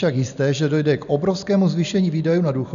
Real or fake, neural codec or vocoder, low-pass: real; none; 7.2 kHz